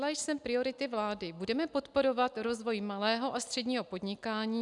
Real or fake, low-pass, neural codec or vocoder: real; 10.8 kHz; none